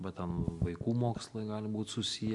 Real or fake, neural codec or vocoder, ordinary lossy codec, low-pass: real; none; MP3, 64 kbps; 10.8 kHz